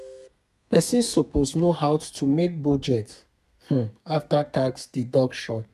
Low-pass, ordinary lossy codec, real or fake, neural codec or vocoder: 14.4 kHz; none; fake; codec, 32 kHz, 1.9 kbps, SNAC